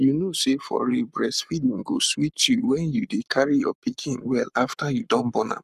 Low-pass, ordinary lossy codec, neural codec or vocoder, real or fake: 14.4 kHz; none; codec, 44.1 kHz, 7.8 kbps, Pupu-Codec; fake